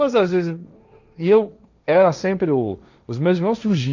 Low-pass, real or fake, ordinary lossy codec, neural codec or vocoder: 7.2 kHz; fake; none; codec, 16 kHz, 1.1 kbps, Voila-Tokenizer